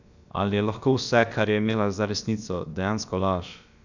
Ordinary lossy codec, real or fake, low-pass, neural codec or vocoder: none; fake; 7.2 kHz; codec, 16 kHz, about 1 kbps, DyCAST, with the encoder's durations